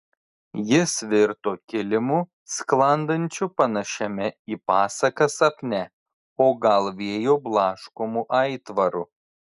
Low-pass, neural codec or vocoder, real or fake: 10.8 kHz; none; real